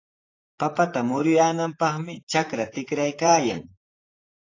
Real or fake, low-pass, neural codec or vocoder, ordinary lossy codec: fake; 7.2 kHz; vocoder, 44.1 kHz, 128 mel bands, Pupu-Vocoder; AAC, 32 kbps